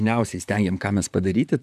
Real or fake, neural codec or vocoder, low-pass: fake; vocoder, 44.1 kHz, 128 mel bands, Pupu-Vocoder; 14.4 kHz